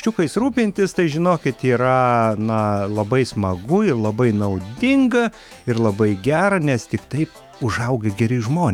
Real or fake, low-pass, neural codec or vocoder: fake; 19.8 kHz; autoencoder, 48 kHz, 128 numbers a frame, DAC-VAE, trained on Japanese speech